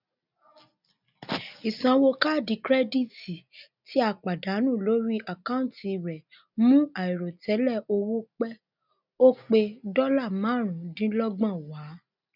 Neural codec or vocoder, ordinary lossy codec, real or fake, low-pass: none; none; real; 5.4 kHz